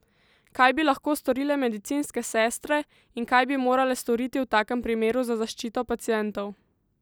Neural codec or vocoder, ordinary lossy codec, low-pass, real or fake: none; none; none; real